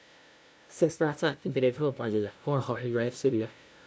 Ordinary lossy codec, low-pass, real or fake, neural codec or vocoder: none; none; fake; codec, 16 kHz, 0.5 kbps, FunCodec, trained on LibriTTS, 25 frames a second